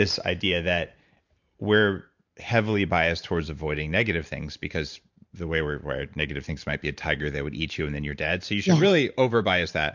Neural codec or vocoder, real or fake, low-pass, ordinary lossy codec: vocoder, 44.1 kHz, 128 mel bands every 512 samples, BigVGAN v2; fake; 7.2 kHz; MP3, 64 kbps